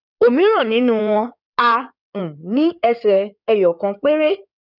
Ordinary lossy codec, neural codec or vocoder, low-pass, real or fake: none; codec, 16 kHz in and 24 kHz out, 2.2 kbps, FireRedTTS-2 codec; 5.4 kHz; fake